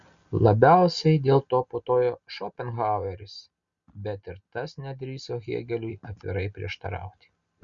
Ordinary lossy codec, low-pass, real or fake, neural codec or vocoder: Opus, 64 kbps; 7.2 kHz; real; none